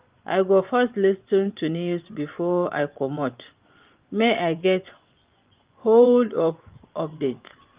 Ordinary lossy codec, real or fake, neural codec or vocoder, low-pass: Opus, 24 kbps; fake; vocoder, 24 kHz, 100 mel bands, Vocos; 3.6 kHz